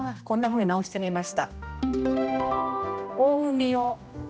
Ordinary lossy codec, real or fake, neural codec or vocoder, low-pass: none; fake; codec, 16 kHz, 1 kbps, X-Codec, HuBERT features, trained on general audio; none